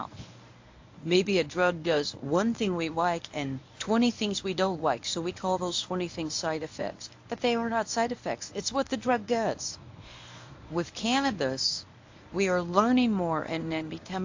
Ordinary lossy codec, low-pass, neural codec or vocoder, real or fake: AAC, 48 kbps; 7.2 kHz; codec, 24 kHz, 0.9 kbps, WavTokenizer, medium speech release version 1; fake